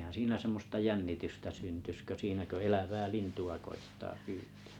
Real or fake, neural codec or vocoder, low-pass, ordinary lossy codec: real; none; 19.8 kHz; none